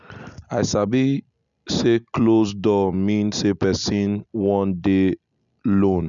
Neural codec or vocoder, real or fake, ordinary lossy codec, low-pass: none; real; none; 7.2 kHz